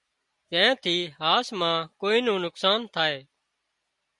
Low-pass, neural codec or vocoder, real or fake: 10.8 kHz; none; real